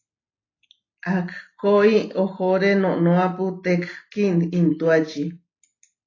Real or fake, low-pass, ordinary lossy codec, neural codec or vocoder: real; 7.2 kHz; AAC, 32 kbps; none